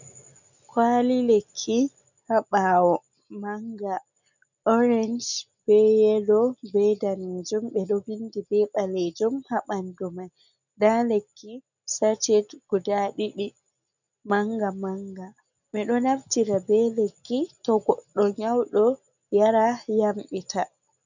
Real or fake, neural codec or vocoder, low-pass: real; none; 7.2 kHz